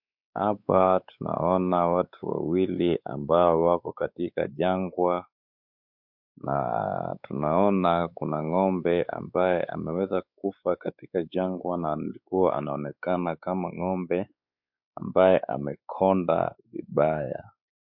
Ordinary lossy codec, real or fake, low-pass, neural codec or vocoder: MP3, 48 kbps; fake; 5.4 kHz; codec, 16 kHz, 4 kbps, X-Codec, WavLM features, trained on Multilingual LibriSpeech